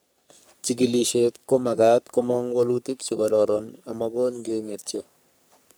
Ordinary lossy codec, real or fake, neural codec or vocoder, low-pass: none; fake; codec, 44.1 kHz, 3.4 kbps, Pupu-Codec; none